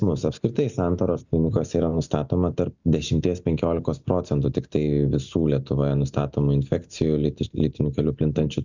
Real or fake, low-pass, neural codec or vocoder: real; 7.2 kHz; none